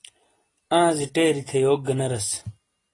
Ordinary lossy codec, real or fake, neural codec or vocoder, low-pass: AAC, 32 kbps; real; none; 10.8 kHz